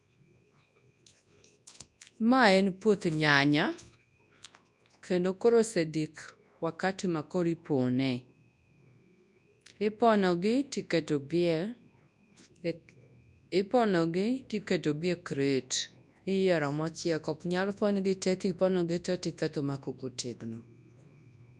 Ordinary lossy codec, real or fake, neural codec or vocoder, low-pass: none; fake; codec, 24 kHz, 0.9 kbps, WavTokenizer, large speech release; 10.8 kHz